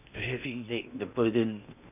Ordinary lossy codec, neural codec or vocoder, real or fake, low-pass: none; codec, 16 kHz in and 24 kHz out, 0.8 kbps, FocalCodec, streaming, 65536 codes; fake; 3.6 kHz